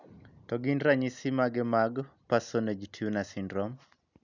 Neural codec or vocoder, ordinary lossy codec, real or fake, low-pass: none; none; real; 7.2 kHz